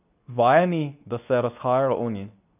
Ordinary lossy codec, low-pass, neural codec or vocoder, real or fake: none; 3.6 kHz; codec, 24 kHz, 0.9 kbps, WavTokenizer, medium speech release version 2; fake